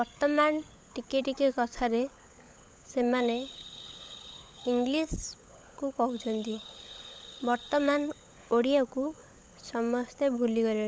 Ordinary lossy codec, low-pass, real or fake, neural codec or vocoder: none; none; fake; codec, 16 kHz, 16 kbps, FunCodec, trained on LibriTTS, 50 frames a second